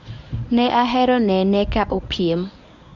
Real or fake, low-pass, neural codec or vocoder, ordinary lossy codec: fake; 7.2 kHz; codec, 24 kHz, 0.9 kbps, WavTokenizer, medium speech release version 1; none